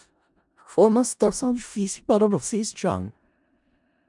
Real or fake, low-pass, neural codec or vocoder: fake; 10.8 kHz; codec, 16 kHz in and 24 kHz out, 0.4 kbps, LongCat-Audio-Codec, four codebook decoder